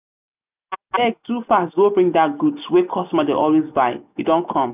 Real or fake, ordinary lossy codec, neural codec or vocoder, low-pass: real; none; none; 3.6 kHz